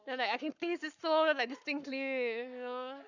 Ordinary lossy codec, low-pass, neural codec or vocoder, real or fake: none; 7.2 kHz; codec, 44.1 kHz, 7.8 kbps, Pupu-Codec; fake